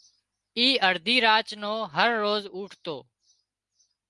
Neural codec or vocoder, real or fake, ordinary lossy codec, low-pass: none; real; Opus, 32 kbps; 10.8 kHz